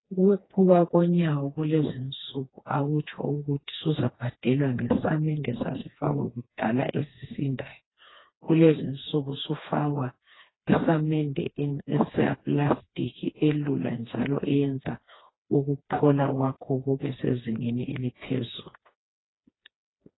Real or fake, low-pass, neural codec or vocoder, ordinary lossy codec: fake; 7.2 kHz; codec, 16 kHz, 2 kbps, FreqCodec, smaller model; AAC, 16 kbps